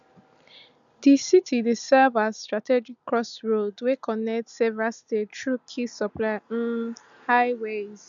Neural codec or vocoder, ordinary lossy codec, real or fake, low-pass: none; none; real; 7.2 kHz